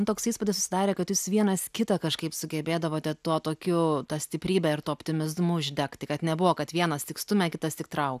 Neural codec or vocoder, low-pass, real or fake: none; 14.4 kHz; real